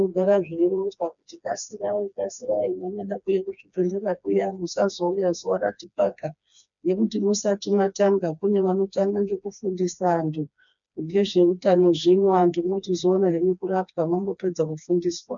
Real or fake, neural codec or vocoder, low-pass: fake; codec, 16 kHz, 2 kbps, FreqCodec, smaller model; 7.2 kHz